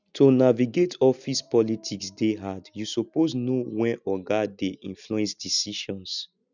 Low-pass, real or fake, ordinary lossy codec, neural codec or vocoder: 7.2 kHz; real; none; none